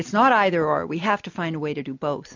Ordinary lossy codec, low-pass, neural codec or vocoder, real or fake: MP3, 48 kbps; 7.2 kHz; none; real